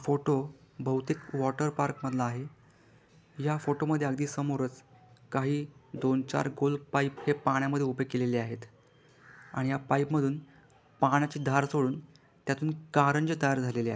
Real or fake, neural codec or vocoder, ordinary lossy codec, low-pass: real; none; none; none